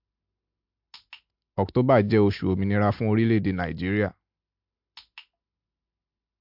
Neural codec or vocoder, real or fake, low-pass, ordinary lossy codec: none; real; 5.4 kHz; MP3, 48 kbps